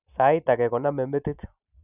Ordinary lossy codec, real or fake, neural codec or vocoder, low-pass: none; real; none; 3.6 kHz